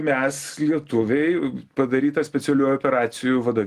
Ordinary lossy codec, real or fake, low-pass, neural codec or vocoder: Opus, 24 kbps; real; 14.4 kHz; none